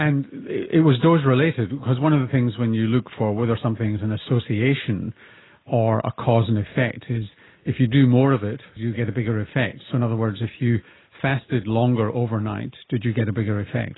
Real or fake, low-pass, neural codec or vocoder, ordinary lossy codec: real; 7.2 kHz; none; AAC, 16 kbps